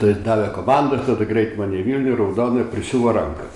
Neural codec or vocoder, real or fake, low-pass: none; real; 10.8 kHz